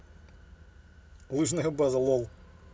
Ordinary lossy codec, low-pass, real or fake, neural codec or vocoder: none; none; real; none